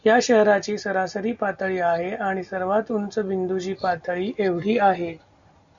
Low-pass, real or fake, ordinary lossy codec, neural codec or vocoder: 7.2 kHz; real; Opus, 64 kbps; none